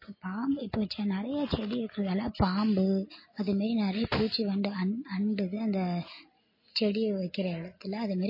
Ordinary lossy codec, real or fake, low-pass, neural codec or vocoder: MP3, 24 kbps; real; 5.4 kHz; none